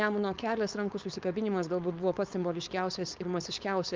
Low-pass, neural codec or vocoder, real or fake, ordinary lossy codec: 7.2 kHz; codec, 16 kHz, 4.8 kbps, FACodec; fake; Opus, 32 kbps